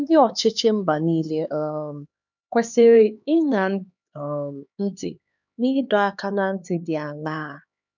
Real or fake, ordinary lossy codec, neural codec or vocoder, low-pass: fake; none; codec, 16 kHz, 2 kbps, X-Codec, HuBERT features, trained on LibriSpeech; 7.2 kHz